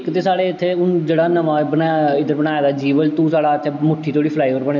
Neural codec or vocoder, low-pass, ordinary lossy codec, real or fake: none; 7.2 kHz; none; real